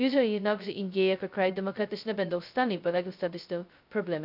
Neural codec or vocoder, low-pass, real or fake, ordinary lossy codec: codec, 16 kHz, 0.2 kbps, FocalCodec; 5.4 kHz; fake; none